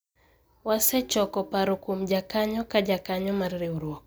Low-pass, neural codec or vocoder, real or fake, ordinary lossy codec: none; none; real; none